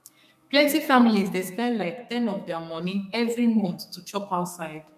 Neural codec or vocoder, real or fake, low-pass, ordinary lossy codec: codec, 32 kHz, 1.9 kbps, SNAC; fake; 14.4 kHz; none